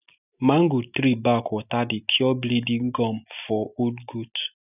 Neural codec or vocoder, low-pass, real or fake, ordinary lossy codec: none; 3.6 kHz; real; none